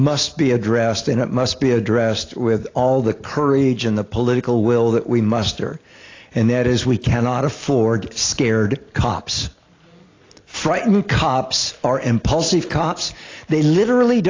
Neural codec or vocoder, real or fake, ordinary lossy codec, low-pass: none; real; AAC, 32 kbps; 7.2 kHz